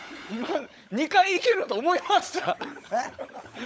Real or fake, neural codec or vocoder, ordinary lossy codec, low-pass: fake; codec, 16 kHz, 16 kbps, FunCodec, trained on LibriTTS, 50 frames a second; none; none